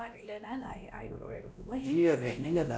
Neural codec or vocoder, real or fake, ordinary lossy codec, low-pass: codec, 16 kHz, 1 kbps, X-Codec, WavLM features, trained on Multilingual LibriSpeech; fake; none; none